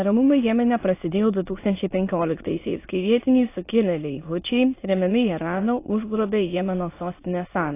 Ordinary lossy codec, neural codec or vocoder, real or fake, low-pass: AAC, 24 kbps; autoencoder, 22.05 kHz, a latent of 192 numbers a frame, VITS, trained on many speakers; fake; 3.6 kHz